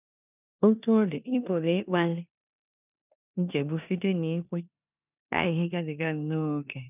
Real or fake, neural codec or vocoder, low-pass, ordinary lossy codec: fake; codec, 16 kHz in and 24 kHz out, 0.9 kbps, LongCat-Audio-Codec, four codebook decoder; 3.6 kHz; none